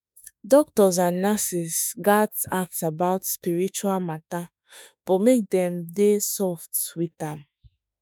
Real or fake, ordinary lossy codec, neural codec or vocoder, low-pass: fake; none; autoencoder, 48 kHz, 32 numbers a frame, DAC-VAE, trained on Japanese speech; none